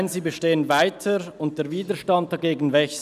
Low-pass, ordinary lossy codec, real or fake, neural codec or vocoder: 14.4 kHz; none; real; none